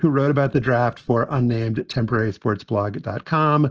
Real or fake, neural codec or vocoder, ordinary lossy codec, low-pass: real; none; Opus, 16 kbps; 7.2 kHz